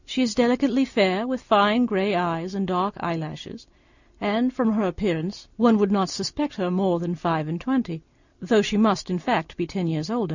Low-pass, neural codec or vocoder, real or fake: 7.2 kHz; none; real